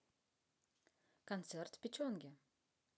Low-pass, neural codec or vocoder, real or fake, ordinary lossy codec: none; none; real; none